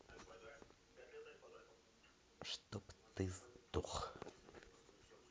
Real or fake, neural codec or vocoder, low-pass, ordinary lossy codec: real; none; none; none